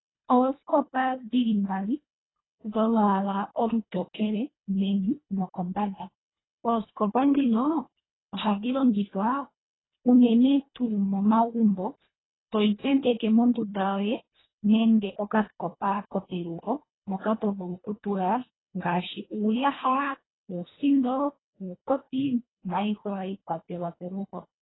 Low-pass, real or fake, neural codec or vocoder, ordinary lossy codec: 7.2 kHz; fake; codec, 24 kHz, 1.5 kbps, HILCodec; AAC, 16 kbps